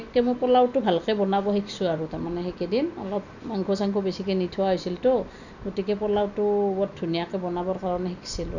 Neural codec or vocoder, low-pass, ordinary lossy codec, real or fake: none; 7.2 kHz; none; real